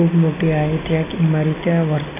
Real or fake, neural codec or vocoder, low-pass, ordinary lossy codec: real; none; 3.6 kHz; AAC, 16 kbps